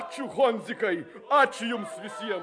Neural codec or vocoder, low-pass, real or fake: none; 9.9 kHz; real